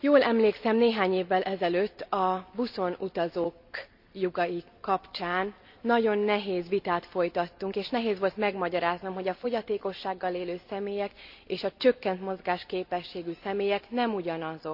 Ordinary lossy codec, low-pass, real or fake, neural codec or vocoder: none; 5.4 kHz; real; none